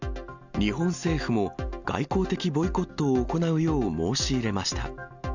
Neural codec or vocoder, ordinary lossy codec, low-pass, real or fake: none; none; 7.2 kHz; real